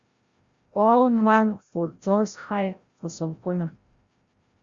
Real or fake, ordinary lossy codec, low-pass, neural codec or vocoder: fake; Opus, 64 kbps; 7.2 kHz; codec, 16 kHz, 0.5 kbps, FreqCodec, larger model